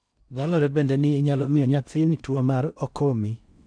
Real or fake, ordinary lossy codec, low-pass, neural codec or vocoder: fake; none; 9.9 kHz; codec, 16 kHz in and 24 kHz out, 0.6 kbps, FocalCodec, streaming, 2048 codes